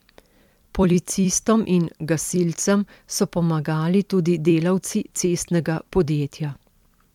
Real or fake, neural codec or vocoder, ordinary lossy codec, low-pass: fake; vocoder, 44.1 kHz, 128 mel bands every 256 samples, BigVGAN v2; MP3, 96 kbps; 19.8 kHz